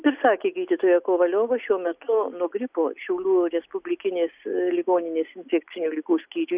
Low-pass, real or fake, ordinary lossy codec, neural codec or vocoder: 3.6 kHz; real; Opus, 64 kbps; none